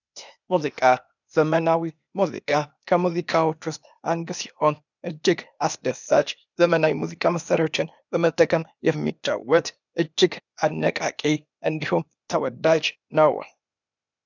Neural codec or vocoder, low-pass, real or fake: codec, 16 kHz, 0.8 kbps, ZipCodec; 7.2 kHz; fake